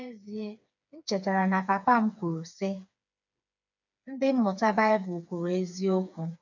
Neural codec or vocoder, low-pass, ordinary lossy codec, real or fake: codec, 16 kHz, 4 kbps, FreqCodec, smaller model; 7.2 kHz; none; fake